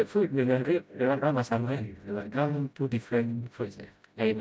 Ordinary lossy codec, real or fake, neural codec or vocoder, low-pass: none; fake; codec, 16 kHz, 0.5 kbps, FreqCodec, smaller model; none